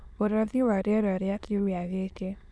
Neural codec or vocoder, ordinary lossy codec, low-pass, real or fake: autoencoder, 22.05 kHz, a latent of 192 numbers a frame, VITS, trained on many speakers; none; none; fake